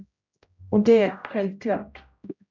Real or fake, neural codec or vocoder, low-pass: fake; codec, 16 kHz, 0.5 kbps, X-Codec, HuBERT features, trained on general audio; 7.2 kHz